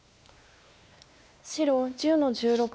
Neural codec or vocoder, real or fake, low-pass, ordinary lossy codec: codec, 16 kHz, 2 kbps, X-Codec, WavLM features, trained on Multilingual LibriSpeech; fake; none; none